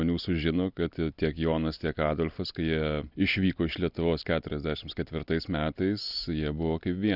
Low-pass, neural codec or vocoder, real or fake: 5.4 kHz; none; real